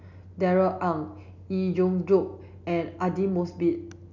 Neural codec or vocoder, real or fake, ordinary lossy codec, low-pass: none; real; none; 7.2 kHz